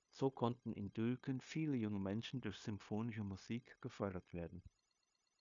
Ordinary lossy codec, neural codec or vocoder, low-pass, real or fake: AAC, 64 kbps; codec, 16 kHz, 0.9 kbps, LongCat-Audio-Codec; 7.2 kHz; fake